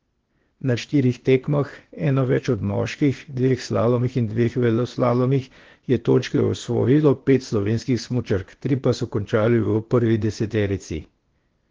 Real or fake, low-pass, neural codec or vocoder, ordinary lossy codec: fake; 7.2 kHz; codec, 16 kHz, 0.8 kbps, ZipCodec; Opus, 16 kbps